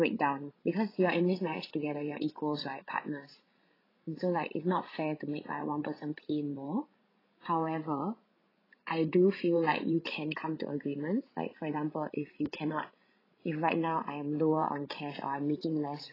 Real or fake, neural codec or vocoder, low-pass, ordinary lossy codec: fake; codec, 16 kHz, 16 kbps, FreqCodec, larger model; 5.4 kHz; AAC, 24 kbps